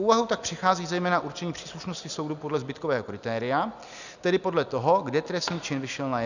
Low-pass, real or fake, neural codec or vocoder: 7.2 kHz; real; none